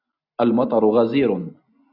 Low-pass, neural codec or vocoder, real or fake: 5.4 kHz; none; real